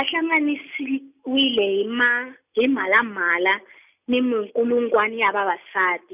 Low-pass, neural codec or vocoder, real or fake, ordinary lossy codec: 3.6 kHz; none; real; none